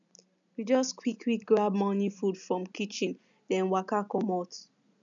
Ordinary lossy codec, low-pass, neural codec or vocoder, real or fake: none; 7.2 kHz; none; real